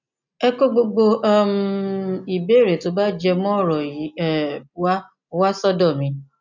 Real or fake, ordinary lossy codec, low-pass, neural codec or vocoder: real; none; 7.2 kHz; none